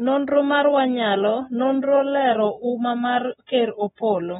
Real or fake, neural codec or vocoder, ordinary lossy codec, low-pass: real; none; AAC, 16 kbps; 10.8 kHz